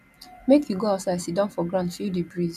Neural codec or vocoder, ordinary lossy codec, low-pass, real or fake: none; none; 14.4 kHz; real